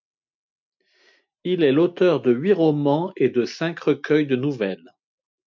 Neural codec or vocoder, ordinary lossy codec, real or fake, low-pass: none; MP3, 64 kbps; real; 7.2 kHz